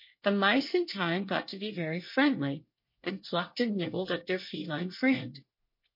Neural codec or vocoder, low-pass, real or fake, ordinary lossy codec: codec, 24 kHz, 1 kbps, SNAC; 5.4 kHz; fake; MP3, 32 kbps